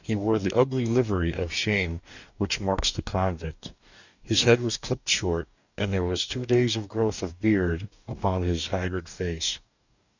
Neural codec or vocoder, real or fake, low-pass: codec, 44.1 kHz, 2.6 kbps, DAC; fake; 7.2 kHz